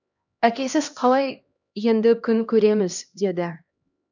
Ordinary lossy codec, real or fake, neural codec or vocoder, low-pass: none; fake; codec, 16 kHz, 1 kbps, X-Codec, HuBERT features, trained on LibriSpeech; 7.2 kHz